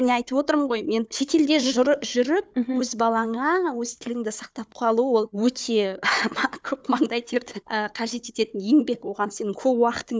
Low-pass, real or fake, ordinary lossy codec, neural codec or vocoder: none; fake; none; codec, 16 kHz, 4 kbps, FunCodec, trained on Chinese and English, 50 frames a second